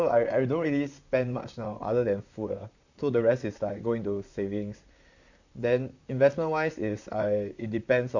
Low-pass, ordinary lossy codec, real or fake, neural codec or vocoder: 7.2 kHz; none; fake; vocoder, 44.1 kHz, 128 mel bands, Pupu-Vocoder